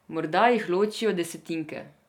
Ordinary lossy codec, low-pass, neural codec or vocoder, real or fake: none; 19.8 kHz; none; real